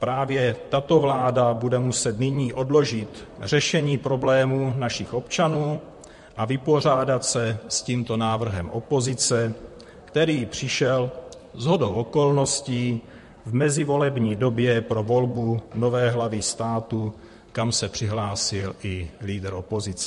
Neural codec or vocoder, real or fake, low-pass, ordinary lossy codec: vocoder, 44.1 kHz, 128 mel bands, Pupu-Vocoder; fake; 14.4 kHz; MP3, 48 kbps